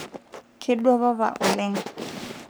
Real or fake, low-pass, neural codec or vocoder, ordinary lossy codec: fake; none; codec, 44.1 kHz, 3.4 kbps, Pupu-Codec; none